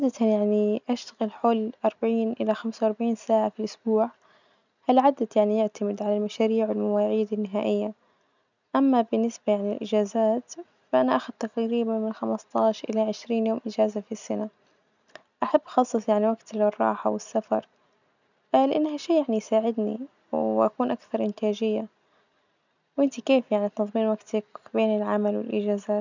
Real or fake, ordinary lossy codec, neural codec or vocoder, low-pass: real; none; none; 7.2 kHz